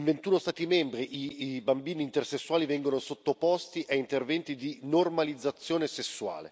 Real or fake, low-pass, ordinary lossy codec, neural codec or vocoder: real; none; none; none